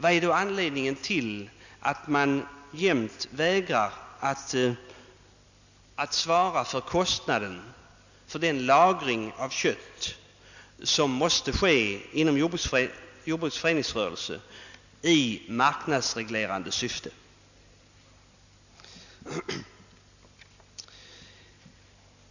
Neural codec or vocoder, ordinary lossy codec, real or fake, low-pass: none; none; real; 7.2 kHz